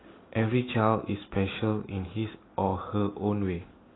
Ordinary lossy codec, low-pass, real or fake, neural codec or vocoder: AAC, 16 kbps; 7.2 kHz; real; none